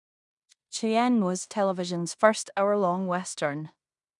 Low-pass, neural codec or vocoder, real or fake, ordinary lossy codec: 10.8 kHz; codec, 16 kHz in and 24 kHz out, 0.9 kbps, LongCat-Audio-Codec, fine tuned four codebook decoder; fake; none